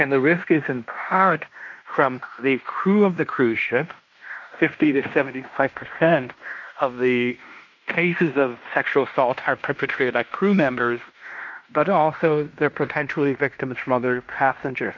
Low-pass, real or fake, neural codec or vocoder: 7.2 kHz; fake; codec, 16 kHz in and 24 kHz out, 0.9 kbps, LongCat-Audio-Codec, fine tuned four codebook decoder